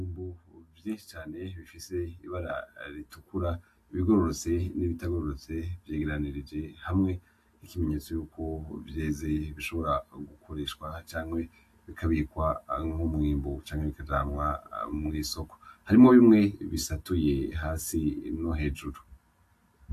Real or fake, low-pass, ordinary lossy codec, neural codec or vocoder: real; 14.4 kHz; AAC, 64 kbps; none